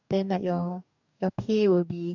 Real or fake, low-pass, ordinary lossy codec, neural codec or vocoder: fake; 7.2 kHz; none; codec, 44.1 kHz, 2.6 kbps, DAC